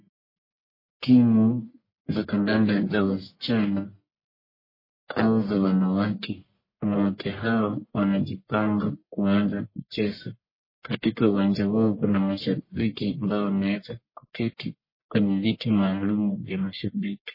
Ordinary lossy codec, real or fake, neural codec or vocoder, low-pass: MP3, 24 kbps; fake; codec, 44.1 kHz, 1.7 kbps, Pupu-Codec; 5.4 kHz